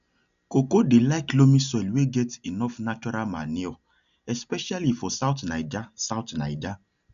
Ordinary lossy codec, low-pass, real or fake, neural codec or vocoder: none; 7.2 kHz; real; none